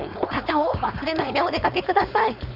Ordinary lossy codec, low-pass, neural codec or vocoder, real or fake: none; 5.4 kHz; codec, 16 kHz, 4.8 kbps, FACodec; fake